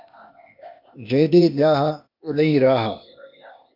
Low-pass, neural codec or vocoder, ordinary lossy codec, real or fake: 5.4 kHz; codec, 16 kHz, 0.8 kbps, ZipCodec; MP3, 48 kbps; fake